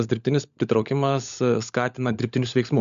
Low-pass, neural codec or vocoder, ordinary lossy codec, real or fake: 7.2 kHz; codec, 16 kHz, 16 kbps, FunCodec, trained on LibriTTS, 50 frames a second; MP3, 64 kbps; fake